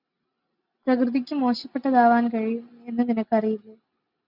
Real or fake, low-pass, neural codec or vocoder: real; 5.4 kHz; none